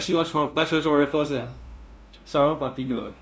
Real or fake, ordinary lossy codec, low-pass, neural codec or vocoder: fake; none; none; codec, 16 kHz, 0.5 kbps, FunCodec, trained on LibriTTS, 25 frames a second